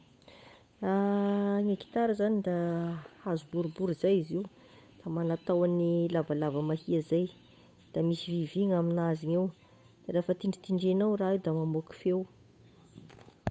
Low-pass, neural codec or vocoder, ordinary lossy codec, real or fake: none; codec, 16 kHz, 8 kbps, FunCodec, trained on Chinese and English, 25 frames a second; none; fake